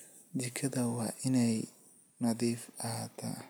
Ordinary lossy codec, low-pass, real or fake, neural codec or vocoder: none; none; real; none